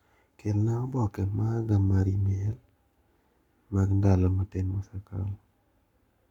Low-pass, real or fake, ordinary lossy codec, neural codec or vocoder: 19.8 kHz; fake; none; codec, 44.1 kHz, 7.8 kbps, Pupu-Codec